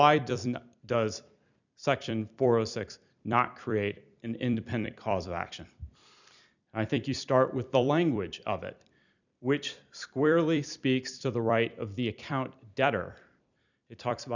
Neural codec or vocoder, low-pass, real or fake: vocoder, 44.1 kHz, 128 mel bands every 256 samples, BigVGAN v2; 7.2 kHz; fake